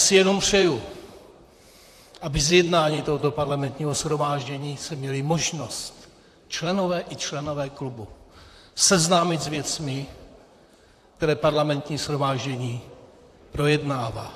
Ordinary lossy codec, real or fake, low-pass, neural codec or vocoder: AAC, 64 kbps; fake; 14.4 kHz; vocoder, 44.1 kHz, 128 mel bands, Pupu-Vocoder